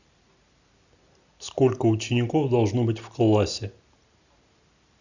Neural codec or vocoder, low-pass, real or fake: none; 7.2 kHz; real